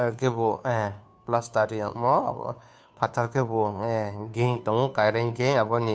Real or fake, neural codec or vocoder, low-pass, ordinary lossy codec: fake; codec, 16 kHz, 2 kbps, FunCodec, trained on Chinese and English, 25 frames a second; none; none